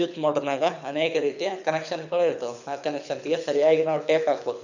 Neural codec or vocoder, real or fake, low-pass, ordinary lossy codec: codec, 24 kHz, 6 kbps, HILCodec; fake; 7.2 kHz; none